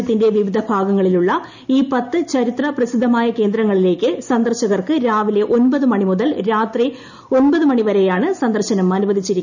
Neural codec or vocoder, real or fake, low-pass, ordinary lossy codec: none; real; 7.2 kHz; none